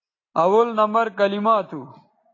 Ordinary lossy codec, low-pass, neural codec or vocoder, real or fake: AAC, 32 kbps; 7.2 kHz; none; real